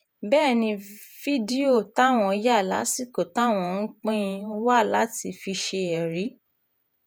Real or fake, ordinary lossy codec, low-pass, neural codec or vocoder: fake; none; none; vocoder, 48 kHz, 128 mel bands, Vocos